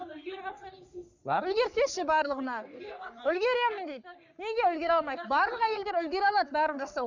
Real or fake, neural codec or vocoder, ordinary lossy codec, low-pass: fake; codec, 44.1 kHz, 3.4 kbps, Pupu-Codec; none; 7.2 kHz